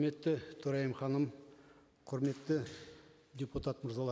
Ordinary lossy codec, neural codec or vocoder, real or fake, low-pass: none; none; real; none